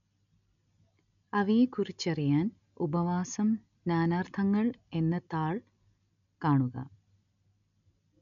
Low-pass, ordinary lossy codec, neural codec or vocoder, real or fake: 7.2 kHz; none; none; real